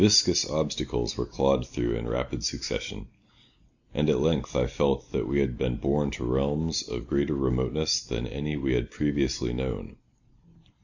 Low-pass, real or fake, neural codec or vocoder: 7.2 kHz; real; none